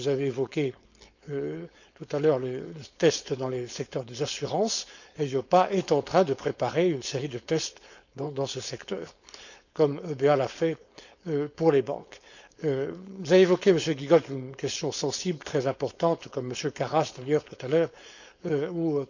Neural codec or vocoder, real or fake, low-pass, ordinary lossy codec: codec, 16 kHz, 4.8 kbps, FACodec; fake; 7.2 kHz; none